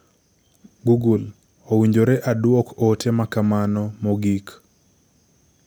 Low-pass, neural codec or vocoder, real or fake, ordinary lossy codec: none; none; real; none